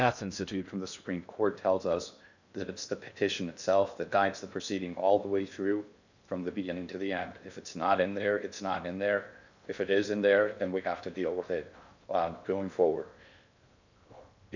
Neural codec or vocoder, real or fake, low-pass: codec, 16 kHz in and 24 kHz out, 0.6 kbps, FocalCodec, streaming, 2048 codes; fake; 7.2 kHz